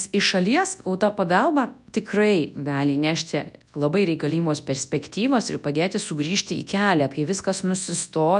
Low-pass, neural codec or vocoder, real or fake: 10.8 kHz; codec, 24 kHz, 0.9 kbps, WavTokenizer, large speech release; fake